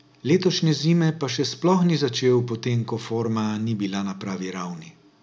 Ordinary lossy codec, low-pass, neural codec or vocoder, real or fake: none; none; none; real